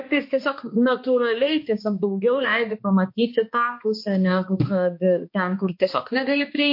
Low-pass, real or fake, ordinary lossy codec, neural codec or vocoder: 5.4 kHz; fake; MP3, 32 kbps; codec, 16 kHz, 2 kbps, X-Codec, HuBERT features, trained on balanced general audio